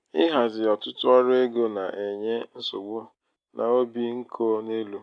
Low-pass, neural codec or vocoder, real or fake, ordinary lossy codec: 9.9 kHz; none; real; AAC, 64 kbps